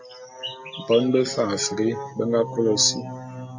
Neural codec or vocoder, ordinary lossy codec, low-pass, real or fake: none; AAC, 48 kbps; 7.2 kHz; real